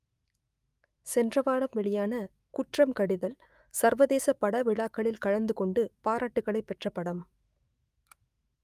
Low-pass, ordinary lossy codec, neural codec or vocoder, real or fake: 14.4 kHz; Opus, 32 kbps; autoencoder, 48 kHz, 128 numbers a frame, DAC-VAE, trained on Japanese speech; fake